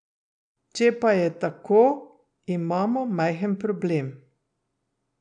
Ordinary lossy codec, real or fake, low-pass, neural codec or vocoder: none; real; 9.9 kHz; none